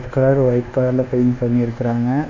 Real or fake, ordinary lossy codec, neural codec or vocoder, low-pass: fake; none; codec, 24 kHz, 1.2 kbps, DualCodec; 7.2 kHz